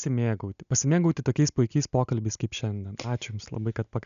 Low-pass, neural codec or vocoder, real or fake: 7.2 kHz; none; real